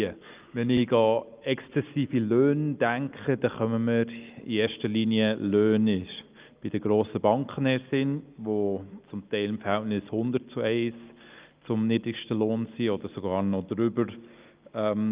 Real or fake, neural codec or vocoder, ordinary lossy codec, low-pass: real; none; Opus, 24 kbps; 3.6 kHz